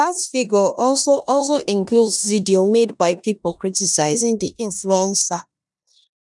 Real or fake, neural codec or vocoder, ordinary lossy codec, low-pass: fake; codec, 16 kHz in and 24 kHz out, 0.9 kbps, LongCat-Audio-Codec, four codebook decoder; none; 10.8 kHz